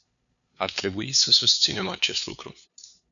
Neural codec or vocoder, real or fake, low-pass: codec, 16 kHz, 2 kbps, FunCodec, trained on LibriTTS, 25 frames a second; fake; 7.2 kHz